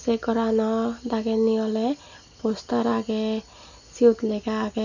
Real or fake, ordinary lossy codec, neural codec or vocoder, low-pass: real; none; none; 7.2 kHz